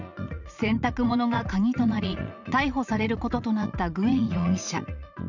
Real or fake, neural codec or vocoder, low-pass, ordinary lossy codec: fake; vocoder, 44.1 kHz, 80 mel bands, Vocos; 7.2 kHz; none